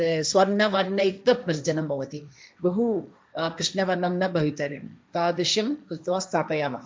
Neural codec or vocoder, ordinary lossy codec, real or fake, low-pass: codec, 16 kHz, 1.1 kbps, Voila-Tokenizer; none; fake; none